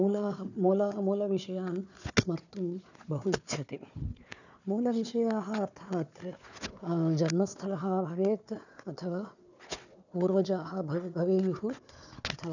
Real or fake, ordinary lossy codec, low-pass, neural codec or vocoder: fake; none; 7.2 kHz; codec, 16 kHz, 4 kbps, FunCodec, trained on Chinese and English, 50 frames a second